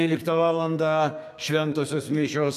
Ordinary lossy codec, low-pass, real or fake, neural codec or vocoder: AAC, 96 kbps; 14.4 kHz; fake; codec, 32 kHz, 1.9 kbps, SNAC